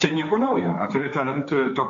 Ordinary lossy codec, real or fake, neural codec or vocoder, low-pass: MP3, 64 kbps; fake; codec, 16 kHz, 1.1 kbps, Voila-Tokenizer; 7.2 kHz